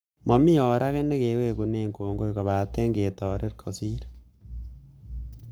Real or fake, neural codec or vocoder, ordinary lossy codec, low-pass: fake; codec, 44.1 kHz, 7.8 kbps, Pupu-Codec; none; none